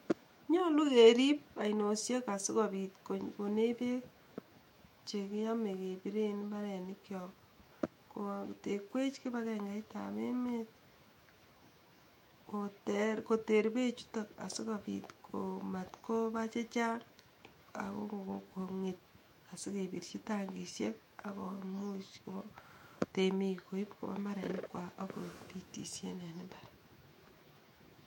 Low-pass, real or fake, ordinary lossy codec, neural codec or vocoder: 19.8 kHz; fake; MP3, 64 kbps; autoencoder, 48 kHz, 128 numbers a frame, DAC-VAE, trained on Japanese speech